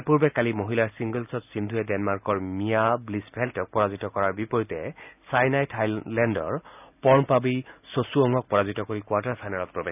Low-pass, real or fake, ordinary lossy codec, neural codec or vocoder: 3.6 kHz; real; none; none